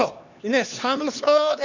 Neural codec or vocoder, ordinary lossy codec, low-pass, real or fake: codec, 16 kHz, 2 kbps, X-Codec, HuBERT features, trained on LibriSpeech; none; 7.2 kHz; fake